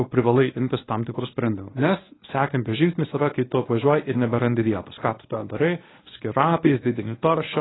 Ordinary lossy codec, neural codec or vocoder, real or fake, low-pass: AAC, 16 kbps; codec, 24 kHz, 0.9 kbps, WavTokenizer, medium speech release version 1; fake; 7.2 kHz